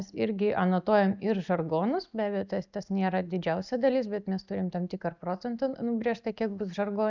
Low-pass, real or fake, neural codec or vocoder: 7.2 kHz; real; none